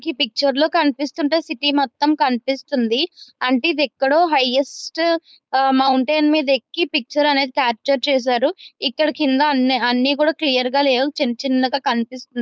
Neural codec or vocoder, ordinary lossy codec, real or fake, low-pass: codec, 16 kHz, 4.8 kbps, FACodec; none; fake; none